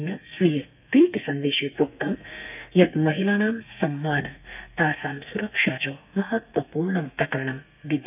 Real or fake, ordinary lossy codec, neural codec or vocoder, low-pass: fake; none; codec, 32 kHz, 1.9 kbps, SNAC; 3.6 kHz